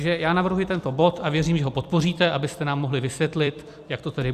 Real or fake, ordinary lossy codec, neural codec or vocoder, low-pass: fake; Opus, 64 kbps; vocoder, 44.1 kHz, 128 mel bands every 256 samples, BigVGAN v2; 14.4 kHz